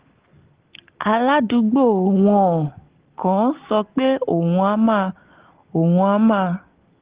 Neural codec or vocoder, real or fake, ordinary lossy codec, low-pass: none; real; Opus, 16 kbps; 3.6 kHz